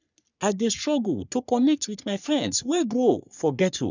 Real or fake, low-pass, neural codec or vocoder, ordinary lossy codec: fake; 7.2 kHz; codec, 44.1 kHz, 3.4 kbps, Pupu-Codec; none